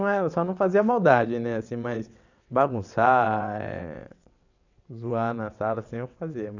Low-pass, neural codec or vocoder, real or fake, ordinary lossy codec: 7.2 kHz; vocoder, 22.05 kHz, 80 mel bands, WaveNeXt; fake; none